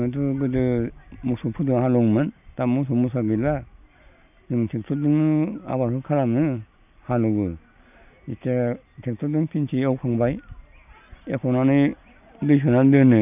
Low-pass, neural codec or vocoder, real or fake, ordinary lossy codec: 3.6 kHz; none; real; none